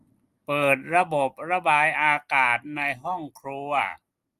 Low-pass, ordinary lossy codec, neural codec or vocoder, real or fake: 14.4 kHz; Opus, 32 kbps; none; real